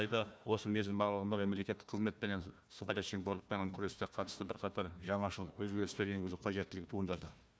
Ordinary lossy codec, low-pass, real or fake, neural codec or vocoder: none; none; fake; codec, 16 kHz, 1 kbps, FunCodec, trained on Chinese and English, 50 frames a second